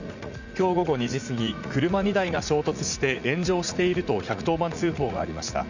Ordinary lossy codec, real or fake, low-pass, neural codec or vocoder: none; fake; 7.2 kHz; vocoder, 44.1 kHz, 80 mel bands, Vocos